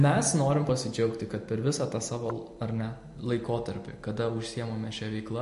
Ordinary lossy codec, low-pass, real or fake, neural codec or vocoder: MP3, 48 kbps; 14.4 kHz; fake; vocoder, 44.1 kHz, 128 mel bands every 256 samples, BigVGAN v2